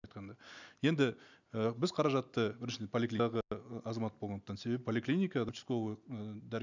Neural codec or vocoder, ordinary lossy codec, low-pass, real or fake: none; none; 7.2 kHz; real